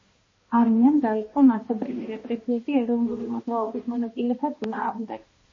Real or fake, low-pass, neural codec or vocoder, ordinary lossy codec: fake; 7.2 kHz; codec, 16 kHz, 1 kbps, X-Codec, HuBERT features, trained on balanced general audio; MP3, 32 kbps